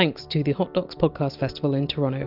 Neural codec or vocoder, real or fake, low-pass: none; real; 5.4 kHz